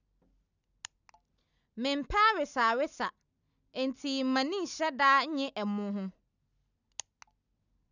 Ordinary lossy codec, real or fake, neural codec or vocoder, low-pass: none; real; none; 7.2 kHz